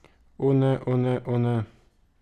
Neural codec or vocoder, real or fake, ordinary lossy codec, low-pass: none; real; none; 14.4 kHz